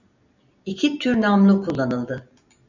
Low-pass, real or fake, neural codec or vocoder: 7.2 kHz; real; none